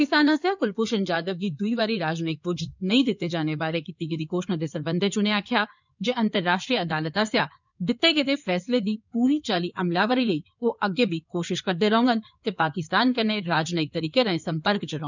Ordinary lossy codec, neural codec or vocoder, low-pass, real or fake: none; codec, 16 kHz in and 24 kHz out, 2.2 kbps, FireRedTTS-2 codec; 7.2 kHz; fake